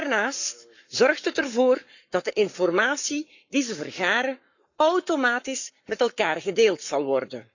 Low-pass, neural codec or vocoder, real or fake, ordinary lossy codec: 7.2 kHz; codec, 44.1 kHz, 7.8 kbps, Pupu-Codec; fake; none